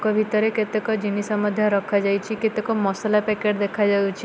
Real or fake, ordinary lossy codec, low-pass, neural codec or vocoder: real; none; none; none